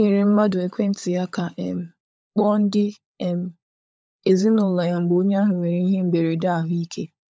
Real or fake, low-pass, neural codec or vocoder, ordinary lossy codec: fake; none; codec, 16 kHz, 16 kbps, FunCodec, trained on LibriTTS, 50 frames a second; none